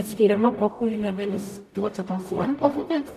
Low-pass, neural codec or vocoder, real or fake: 14.4 kHz; codec, 44.1 kHz, 0.9 kbps, DAC; fake